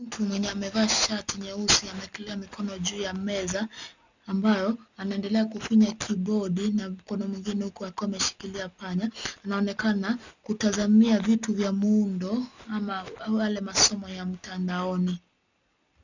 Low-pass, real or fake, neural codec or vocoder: 7.2 kHz; real; none